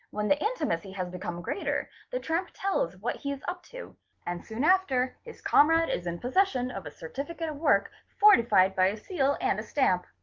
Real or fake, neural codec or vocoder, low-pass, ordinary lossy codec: real; none; 7.2 kHz; Opus, 32 kbps